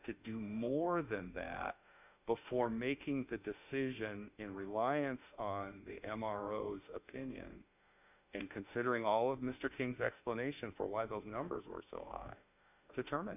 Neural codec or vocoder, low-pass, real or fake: autoencoder, 48 kHz, 32 numbers a frame, DAC-VAE, trained on Japanese speech; 3.6 kHz; fake